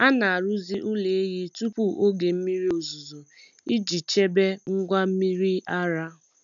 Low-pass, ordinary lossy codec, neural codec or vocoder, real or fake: 7.2 kHz; none; none; real